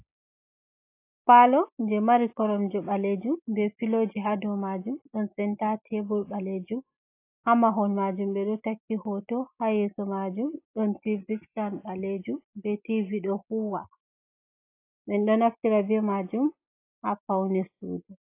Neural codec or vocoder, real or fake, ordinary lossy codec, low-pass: none; real; AAC, 24 kbps; 3.6 kHz